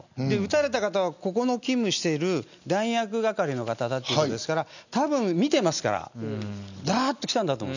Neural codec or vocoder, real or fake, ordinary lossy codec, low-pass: none; real; none; 7.2 kHz